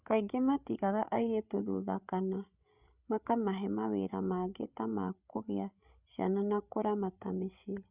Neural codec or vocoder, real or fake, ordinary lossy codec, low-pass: codec, 16 kHz, 8 kbps, FreqCodec, larger model; fake; Opus, 64 kbps; 3.6 kHz